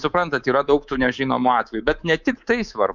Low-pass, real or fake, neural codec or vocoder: 7.2 kHz; fake; codec, 24 kHz, 3.1 kbps, DualCodec